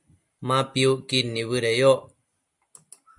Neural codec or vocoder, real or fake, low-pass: none; real; 10.8 kHz